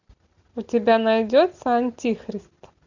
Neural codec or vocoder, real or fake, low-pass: none; real; 7.2 kHz